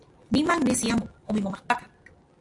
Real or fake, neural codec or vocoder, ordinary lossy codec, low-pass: real; none; AAC, 48 kbps; 10.8 kHz